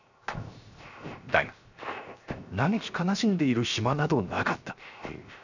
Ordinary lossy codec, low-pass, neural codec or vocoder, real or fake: none; 7.2 kHz; codec, 16 kHz, 0.7 kbps, FocalCodec; fake